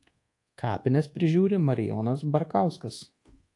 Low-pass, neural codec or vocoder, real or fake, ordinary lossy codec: 10.8 kHz; codec, 24 kHz, 1.2 kbps, DualCodec; fake; AAC, 48 kbps